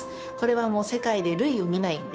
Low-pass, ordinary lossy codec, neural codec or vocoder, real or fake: none; none; codec, 16 kHz, 2 kbps, FunCodec, trained on Chinese and English, 25 frames a second; fake